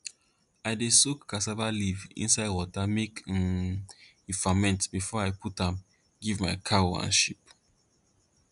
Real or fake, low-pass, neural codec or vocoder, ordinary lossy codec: real; 10.8 kHz; none; none